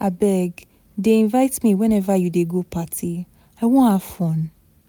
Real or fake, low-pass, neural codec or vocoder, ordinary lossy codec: real; none; none; none